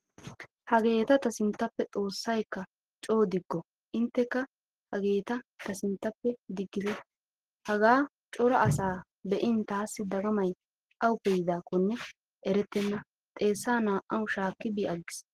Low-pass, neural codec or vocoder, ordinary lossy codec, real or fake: 14.4 kHz; none; Opus, 16 kbps; real